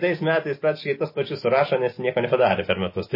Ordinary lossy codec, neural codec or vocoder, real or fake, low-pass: MP3, 24 kbps; none; real; 5.4 kHz